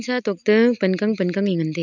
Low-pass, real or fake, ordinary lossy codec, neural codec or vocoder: 7.2 kHz; real; none; none